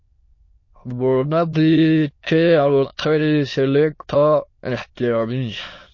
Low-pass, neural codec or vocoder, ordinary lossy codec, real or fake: 7.2 kHz; autoencoder, 22.05 kHz, a latent of 192 numbers a frame, VITS, trained on many speakers; MP3, 32 kbps; fake